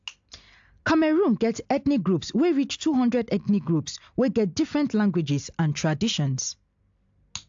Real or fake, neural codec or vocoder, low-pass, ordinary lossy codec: real; none; 7.2 kHz; AAC, 64 kbps